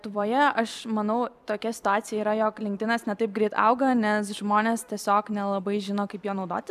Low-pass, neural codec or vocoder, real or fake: 14.4 kHz; vocoder, 44.1 kHz, 128 mel bands every 256 samples, BigVGAN v2; fake